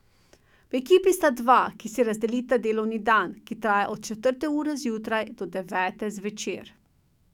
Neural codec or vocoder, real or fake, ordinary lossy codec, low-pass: autoencoder, 48 kHz, 128 numbers a frame, DAC-VAE, trained on Japanese speech; fake; none; 19.8 kHz